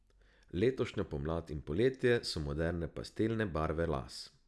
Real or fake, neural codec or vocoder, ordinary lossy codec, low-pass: real; none; none; none